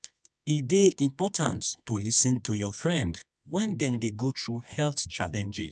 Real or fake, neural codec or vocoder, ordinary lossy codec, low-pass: fake; codec, 24 kHz, 0.9 kbps, WavTokenizer, medium music audio release; none; 10.8 kHz